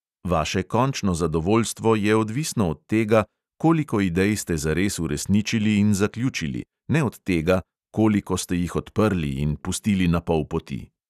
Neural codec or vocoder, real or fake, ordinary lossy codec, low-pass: none; real; none; 14.4 kHz